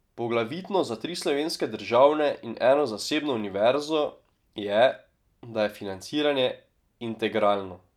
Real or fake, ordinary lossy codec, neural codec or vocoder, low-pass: real; none; none; 19.8 kHz